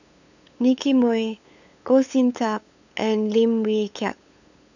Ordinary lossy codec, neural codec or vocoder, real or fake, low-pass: none; codec, 16 kHz, 8 kbps, FunCodec, trained on LibriTTS, 25 frames a second; fake; 7.2 kHz